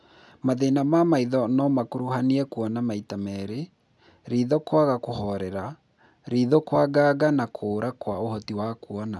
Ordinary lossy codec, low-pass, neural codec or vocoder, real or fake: none; none; none; real